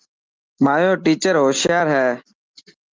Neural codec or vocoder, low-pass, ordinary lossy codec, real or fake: none; 7.2 kHz; Opus, 24 kbps; real